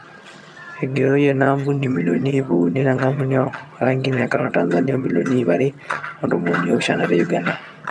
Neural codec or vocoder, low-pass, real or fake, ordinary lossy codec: vocoder, 22.05 kHz, 80 mel bands, HiFi-GAN; none; fake; none